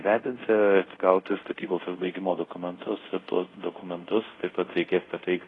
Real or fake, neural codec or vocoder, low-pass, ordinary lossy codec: fake; codec, 24 kHz, 0.5 kbps, DualCodec; 10.8 kHz; AAC, 32 kbps